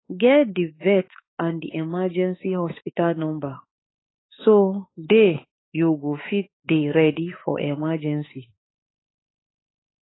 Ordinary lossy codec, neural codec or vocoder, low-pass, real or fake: AAC, 16 kbps; autoencoder, 48 kHz, 32 numbers a frame, DAC-VAE, trained on Japanese speech; 7.2 kHz; fake